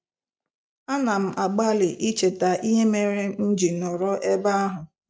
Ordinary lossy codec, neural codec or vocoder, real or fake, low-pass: none; none; real; none